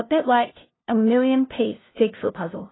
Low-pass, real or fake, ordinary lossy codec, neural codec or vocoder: 7.2 kHz; fake; AAC, 16 kbps; codec, 16 kHz, 0.5 kbps, FunCodec, trained on LibriTTS, 25 frames a second